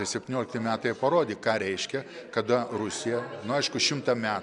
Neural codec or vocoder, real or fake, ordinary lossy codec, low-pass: none; real; MP3, 96 kbps; 10.8 kHz